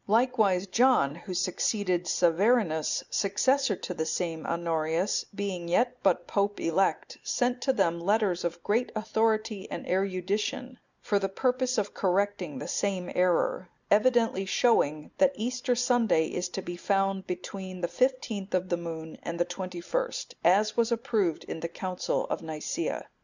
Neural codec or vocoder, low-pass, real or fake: none; 7.2 kHz; real